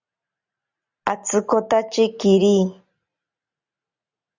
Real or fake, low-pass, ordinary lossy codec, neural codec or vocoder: real; 7.2 kHz; Opus, 64 kbps; none